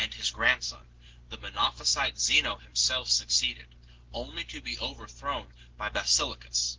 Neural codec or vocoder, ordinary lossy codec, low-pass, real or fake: none; Opus, 16 kbps; 7.2 kHz; real